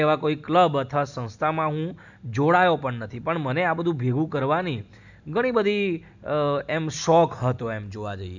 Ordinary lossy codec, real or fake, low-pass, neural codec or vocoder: none; real; 7.2 kHz; none